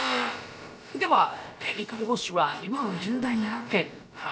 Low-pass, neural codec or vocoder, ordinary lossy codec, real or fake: none; codec, 16 kHz, about 1 kbps, DyCAST, with the encoder's durations; none; fake